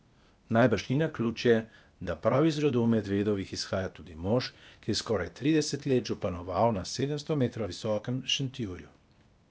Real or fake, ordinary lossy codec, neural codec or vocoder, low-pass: fake; none; codec, 16 kHz, 0.8 kbps, ZipCodec; none